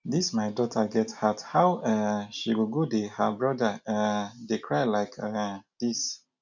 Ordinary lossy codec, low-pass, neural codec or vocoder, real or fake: none; 7.2 kHz; none; real